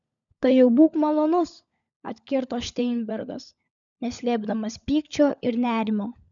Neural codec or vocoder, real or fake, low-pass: codec, 16 kHz, 16 kbps, FunCodec, trained on LibriTTS, 50 frames a second; fake; 7.2 kHz